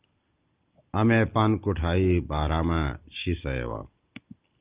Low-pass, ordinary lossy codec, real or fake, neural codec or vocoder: 3.6 kHz; Opus, 32 kbps; real; none